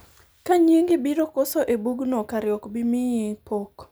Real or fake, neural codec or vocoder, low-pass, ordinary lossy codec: real; none; none; none